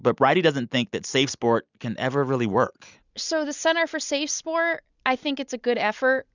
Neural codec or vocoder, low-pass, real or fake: none; 7.2 kHz; real